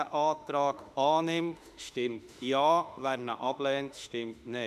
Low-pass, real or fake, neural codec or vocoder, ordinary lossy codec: 14.4 kHz; fake; autoencoder, 48 kHz, 32 numbers a frame, DAC-VAE, trained on Japanese speech; none